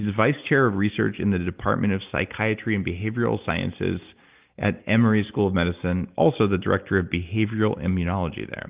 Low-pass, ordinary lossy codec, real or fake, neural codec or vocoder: 3.6 kHz; Opus, 32 kbps; real; none